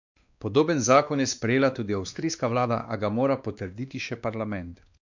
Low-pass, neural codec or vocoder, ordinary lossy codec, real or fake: 7.2 kHz; codec, 16 kHz, 2 kbps, X-Codec, WavLM features, trained on Multilingual LibriSpeech; none; fake